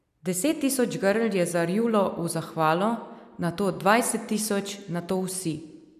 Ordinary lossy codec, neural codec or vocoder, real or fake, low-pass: none; none; real; 14.4 kHz